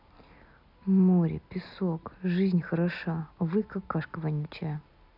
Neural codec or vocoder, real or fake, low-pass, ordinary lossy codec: none; real; 5.4 kHz; none